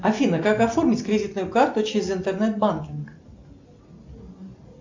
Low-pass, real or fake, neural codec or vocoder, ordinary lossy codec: 7.2 kHz; real; none; MP3, 64 kbps